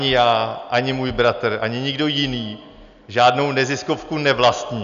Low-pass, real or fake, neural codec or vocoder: 7.2 kHz; real; none